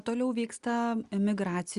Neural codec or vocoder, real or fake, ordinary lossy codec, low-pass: none; real; Opus, 64 kbps; 10.8 kHz